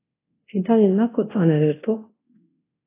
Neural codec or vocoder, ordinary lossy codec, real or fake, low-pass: codec, 24 kHz, 0.9 kbps, DualCodec; AAC, 24 kbps; fake; 3.6 kHz